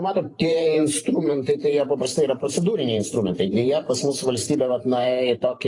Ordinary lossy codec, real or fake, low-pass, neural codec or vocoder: AAC, 32 kbps; fake; 10.8 kHz; vocoder, 44.1 kHz, 128 mel bands every 512 samples, BigVGAN v2